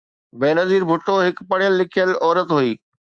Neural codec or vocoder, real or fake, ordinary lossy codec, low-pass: codec, 24 kHz, 3.1 kbps, DualCodec; fake; Opus, 64 kbps; 9.9 kHz